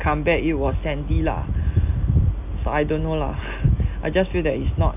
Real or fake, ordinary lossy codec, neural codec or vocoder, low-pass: real; none; none; 3.6 kHz